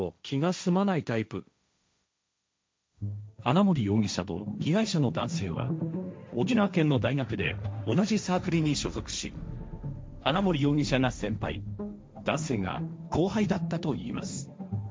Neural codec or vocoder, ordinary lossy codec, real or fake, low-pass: codec, 16 kHz, 1.1 kbps, Voila-Tokenizer; none; fake; none